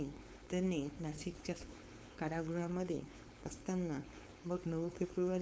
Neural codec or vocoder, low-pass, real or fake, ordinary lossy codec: codec, 16 kHz, 4.8 kbps, FACodec; none; fake; none